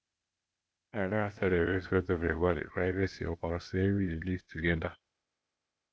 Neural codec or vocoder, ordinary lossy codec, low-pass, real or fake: codec, 16 kHz, 0.8 kbps, ZipCodec; none; none; fake